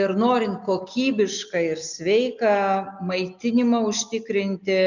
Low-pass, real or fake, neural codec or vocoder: 7.2 kHz; real; none